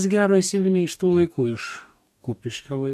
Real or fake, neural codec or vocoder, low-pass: fake; codec, 44.1 kHz, 2.6 kbps, DAC; 14.4 kHz